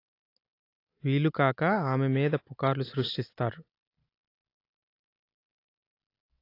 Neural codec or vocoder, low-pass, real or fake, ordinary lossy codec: none; 5.4 kHz; real; AAC, 32 kbps